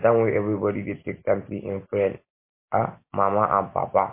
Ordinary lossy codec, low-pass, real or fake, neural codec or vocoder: MP3, 24 kbps; 3.6 kHz; real; none